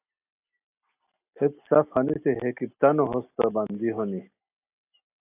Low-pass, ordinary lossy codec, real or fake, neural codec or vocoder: 3.6 kHz; AAC, 32 kbps; real; none